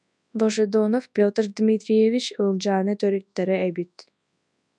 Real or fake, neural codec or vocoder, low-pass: fake; codec, 24 kHz, 0.9 kbps, WavTokenizer, large speech release; 10.8 kHz